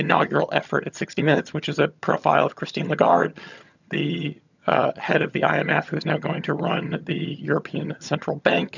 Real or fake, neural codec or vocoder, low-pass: fake; vocoder, 22.05 kHz, 80 mel bands, HiFi-GAN; 7.2 kHz